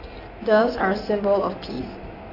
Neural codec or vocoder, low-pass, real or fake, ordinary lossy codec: codec, 16 kHz in and 24 kHz out, 2.2 kbps, FireRedTTS-2 codec; 5.4 kHz; fake; AAC, 32 kbps